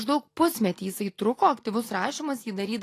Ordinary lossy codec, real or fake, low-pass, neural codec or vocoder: AAC, 48 kbps; real; 14.4 kHz; none